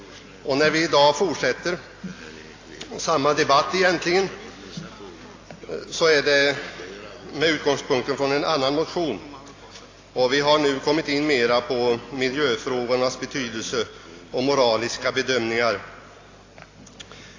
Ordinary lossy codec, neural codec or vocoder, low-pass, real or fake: AAC, 32 kbps; none; 7.2 kHz; real